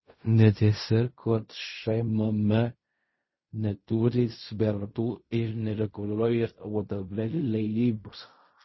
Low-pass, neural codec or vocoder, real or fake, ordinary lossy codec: 7.2 kHz; codec, 16 kHz in and 24 kHz out, 0.4 kbps, LongCat-Audio-Codec, fine tuned four codebook decoder; fake; MP3, 24 kbps